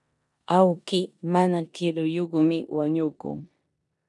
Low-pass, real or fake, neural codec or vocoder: 10.8 kHz; fake; codec, 16 kHz in and 24 kHz out, 0.9 kbps, LongCat-Audio-Codec, four codebook decoder